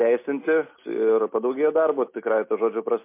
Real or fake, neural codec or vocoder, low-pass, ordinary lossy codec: real; none; 3.6 kHz; MP3, 24 kbps